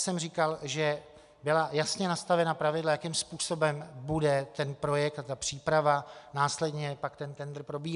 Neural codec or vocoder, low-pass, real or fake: none; 10.8 kHz; real